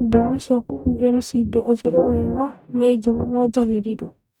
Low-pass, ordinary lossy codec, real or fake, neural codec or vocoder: 19.8 kHz; none; fake; codec, 44.1 kHz, 0.9 kbps, DAC